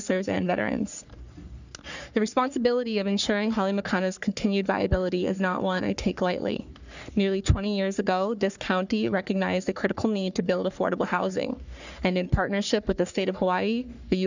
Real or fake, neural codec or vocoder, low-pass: fake; codec, 44.1 kHz, 3.4 kbps, Pupu-Codec; 7.2 kHz